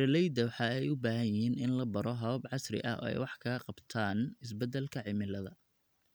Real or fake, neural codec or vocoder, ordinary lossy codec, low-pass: real; none; none; none